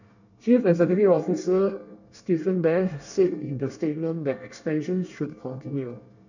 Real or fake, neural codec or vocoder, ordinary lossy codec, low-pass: fake; codec, 24 kHz, 1 kbps, SNAC; none; 7.2 kHz